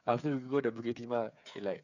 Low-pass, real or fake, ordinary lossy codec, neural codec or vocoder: 7.2 kHz; fake; none; codec, 16 kHz, 8 kbps, FreqCodec, smaller model